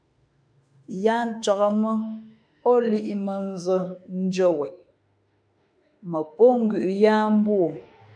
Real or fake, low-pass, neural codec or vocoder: fake; 9.9 kHz; autoencoder, 48 kHz, 32 numbers a frame, DAC-VAE, trained on Japanese speech